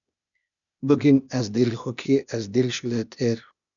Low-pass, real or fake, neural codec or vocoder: 7.2 kHz; fake; codec, 16 kHz, 0.8 kbps, ZipCodec